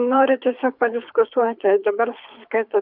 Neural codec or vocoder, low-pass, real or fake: codec, 24 kHz, 6 kbps, HILCodec; 5.4 kHz; fake